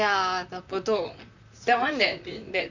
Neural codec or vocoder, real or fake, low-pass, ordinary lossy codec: vocoder, 44.1 kHz, 128 mel bands, Pupu-Vocoder; fake; 7.2 kHz; none